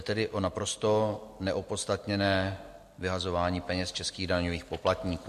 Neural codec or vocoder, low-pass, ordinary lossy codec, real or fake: none; 14.4 kHz; MP3, 64 kbps; real